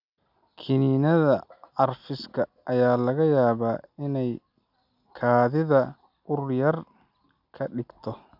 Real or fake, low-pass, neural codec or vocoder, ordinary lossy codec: real; 5.4 kHz; none; none